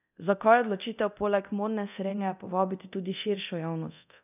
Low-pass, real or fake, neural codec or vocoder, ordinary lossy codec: 3.6 kHz; fake; codec, 24 kHz, 0.9 kbps, DualCodec; none